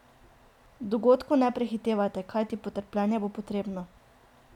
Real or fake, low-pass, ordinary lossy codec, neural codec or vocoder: real; 19.8 kHz; none; none